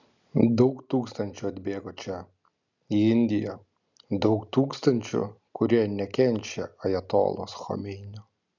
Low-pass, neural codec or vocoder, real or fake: 7.2 kHz; none; real